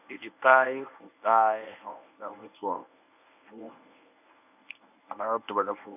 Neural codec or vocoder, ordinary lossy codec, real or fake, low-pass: codec, 24 kHz, 0.9 kbps, WavTokenizer, medium speech release version 1; none; fake; 3.6 kHz